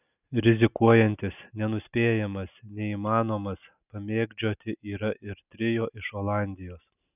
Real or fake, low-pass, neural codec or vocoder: real; 3.6 kHz; none